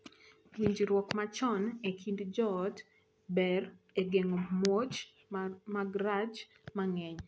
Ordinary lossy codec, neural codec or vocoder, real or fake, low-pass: none; none; real; none